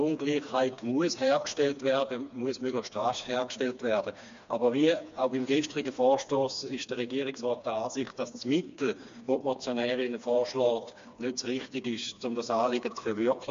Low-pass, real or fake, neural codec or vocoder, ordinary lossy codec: 7.2 kHz; fake; codec, 16 kHz, 2 kbps, FreqCodec, smaller model; MP3, 48 kbps